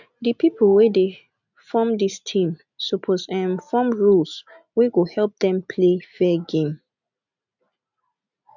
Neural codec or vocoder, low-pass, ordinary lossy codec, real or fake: none; 7.2 kHz; none; real